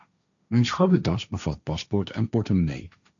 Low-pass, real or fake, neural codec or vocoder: 7.2 kHz; fake; codec, 16 kHz, 1.1 kbps, Voila-Tokenizer